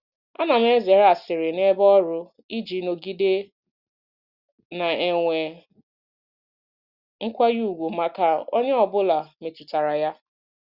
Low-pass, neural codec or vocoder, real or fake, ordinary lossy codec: 5.4 kHz; none; real; Opus, 64 kbps